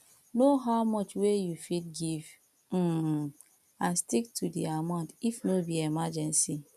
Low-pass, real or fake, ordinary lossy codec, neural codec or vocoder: 14.4 kHz; real; none; none